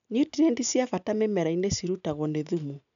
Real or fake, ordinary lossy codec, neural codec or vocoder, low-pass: real; none; none; 7.2 kHz